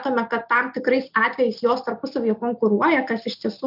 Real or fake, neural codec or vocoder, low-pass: real; none; 5.4 kHz